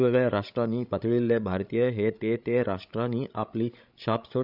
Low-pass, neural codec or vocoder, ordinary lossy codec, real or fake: 5.4 kHz; codec, 16 kHz, 16 kbps, FreqCodec, larger model; none; fake